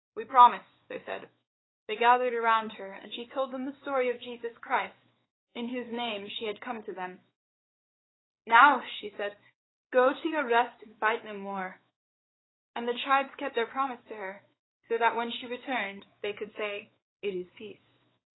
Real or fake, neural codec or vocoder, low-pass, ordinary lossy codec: fake; codec, 16 kHz, 4 kbps, X-Codec, WavLM features, trained on Multilingual LibriSpeech; 7.2 kHz; AAC, 16 kbps